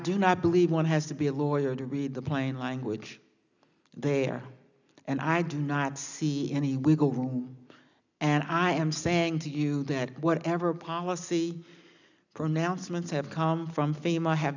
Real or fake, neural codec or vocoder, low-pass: real; none; 7.2 kHz